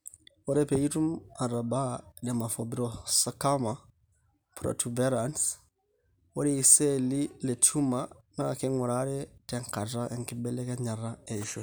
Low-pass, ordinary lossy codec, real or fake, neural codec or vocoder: none; none; real; none